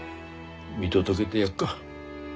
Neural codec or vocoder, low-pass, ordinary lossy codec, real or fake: none; none; none; real